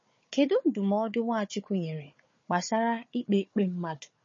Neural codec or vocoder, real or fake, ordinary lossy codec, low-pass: codec, 16 kHz, 4 kbps, FunCodec, trained on Chinese and English, 50 frames a second; fake; MP3, 32 kbps; 7.2 kHz